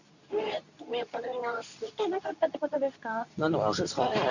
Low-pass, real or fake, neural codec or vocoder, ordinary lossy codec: 7.2 kHz; fake; codec, 24 kHz, 0.9 kbps, WavTokenizer, medium speech release version 2; none